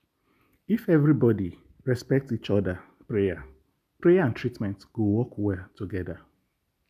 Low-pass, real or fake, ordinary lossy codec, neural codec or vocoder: 14.4 kHz; real; none; none